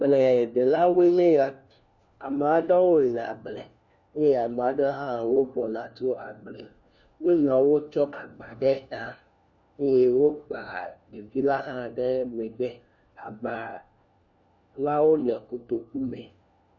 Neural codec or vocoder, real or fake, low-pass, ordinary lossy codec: codec, 16 kHz, 1 kbps, FunCodec, trained on LibriTTS, 50 frames a second; fake; 7.2 kHz; Opus, 64 kbps